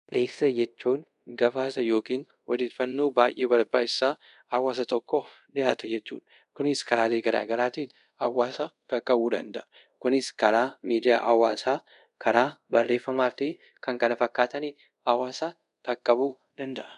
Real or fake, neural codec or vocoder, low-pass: fake; codec, 24 kHz, 0.5 kbps, DualCodec; 10.8 kHz